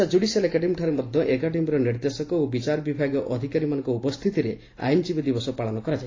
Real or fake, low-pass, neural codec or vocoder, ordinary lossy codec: real; 7.2 kHz; none; AAC, 32 kbps